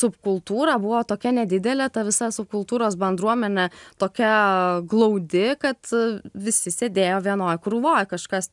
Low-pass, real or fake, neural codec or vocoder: 10.8 kHz; real; none